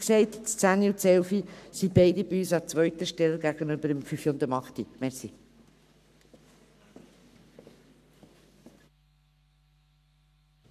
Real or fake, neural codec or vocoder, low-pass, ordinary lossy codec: fake; codec, 44.1 kHz, 7.8 kbps, Pupu-Codec; 14.4 kHz; none